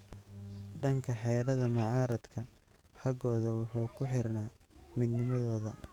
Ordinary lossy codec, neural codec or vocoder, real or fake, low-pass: none; codec, 44.1 kHz, 7.8 kbps, DAC; fake; 19.8 kHz